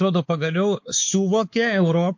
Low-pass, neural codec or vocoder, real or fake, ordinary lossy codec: 7.2 kHz; codec, 16 kHz, 4 kbps, X-Codec, WavLM features, trained on Multilingual LibriSpeech; fake; MP3, 48 kbps